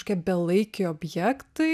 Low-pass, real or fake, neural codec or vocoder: 14.4 kHz; real; none